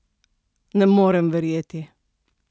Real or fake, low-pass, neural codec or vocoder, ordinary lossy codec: real; none; none; none